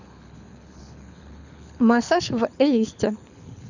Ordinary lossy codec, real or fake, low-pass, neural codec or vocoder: none; fake; 7.2 kHz; codec, 24 kHz, 6 kbps, HILCodec